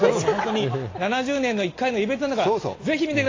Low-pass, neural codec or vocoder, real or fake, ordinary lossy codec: 7.2 kHz; none; real; AAC, 32 kbps